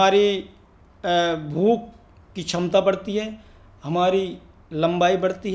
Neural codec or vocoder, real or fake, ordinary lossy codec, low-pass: none; real; none; none